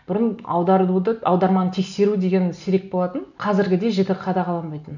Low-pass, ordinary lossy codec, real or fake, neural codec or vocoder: 7.2 kHz; none; real; none